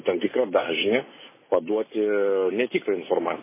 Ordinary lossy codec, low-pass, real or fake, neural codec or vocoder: MP3, 16 kbps; 3.6 kHz; real; none